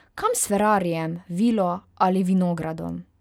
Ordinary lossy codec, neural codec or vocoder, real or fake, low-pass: none; none; real; 19.8 kHz